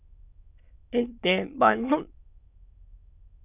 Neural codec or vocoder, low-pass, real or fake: autoencoder, 22.05 kHz, a latent of 192 numbers a frame, VITS, trained on many speakers; 3.6 kHz; fake